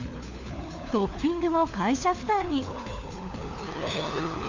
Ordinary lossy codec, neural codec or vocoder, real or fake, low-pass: none; codec, 16 kHz, 4 kbps, FunCodec, trained on LibriTTS, 50 frames a second; fake; 7.2 kHz